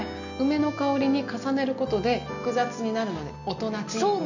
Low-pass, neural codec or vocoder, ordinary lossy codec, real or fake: 7.2 kHz; none; none; real